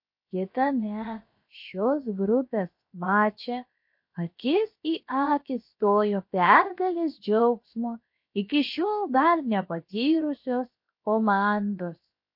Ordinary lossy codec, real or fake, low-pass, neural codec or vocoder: MP3, 32 kbps; fake; 5.4 kHz; codec, 16 kHz, 0.7 kbps, FocalCodec